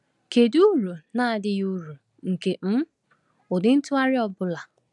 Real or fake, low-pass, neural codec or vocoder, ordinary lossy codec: real; 10.8 kHz; none; none